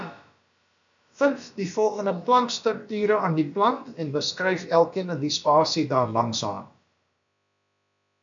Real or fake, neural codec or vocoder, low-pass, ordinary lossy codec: fake; codec, 16 kHz, about 1 kbps, DyCAST, with the encoder's durations; 7.2 kHz; MP3, 96 kbps